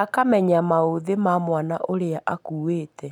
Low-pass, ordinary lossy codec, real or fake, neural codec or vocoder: 19.8 kHz; none; real; none